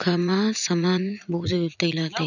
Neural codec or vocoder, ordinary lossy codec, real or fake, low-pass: vocoder, 44.1 kHz, 80 mel bands, Vocos; none; fake; 7.2 kHz